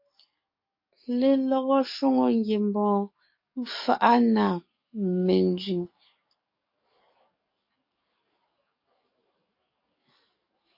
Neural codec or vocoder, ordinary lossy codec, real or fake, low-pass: codec, 44.1 kHz, 7.8 kbps, DAC; MP3, 32 kbps; fake; 5.4 kHz